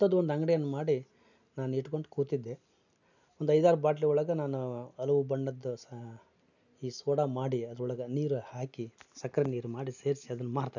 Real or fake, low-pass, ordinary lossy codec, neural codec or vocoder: real; 7.2 kHz; none; none